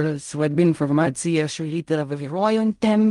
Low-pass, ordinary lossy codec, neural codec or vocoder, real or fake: 10.8 kHz; Opus, 32 kbps; codec, 16 kHz in and 24 kHz out, 0.4 kbps, LongCat-Audio-Codec, fine tuned four codebook decoder; fake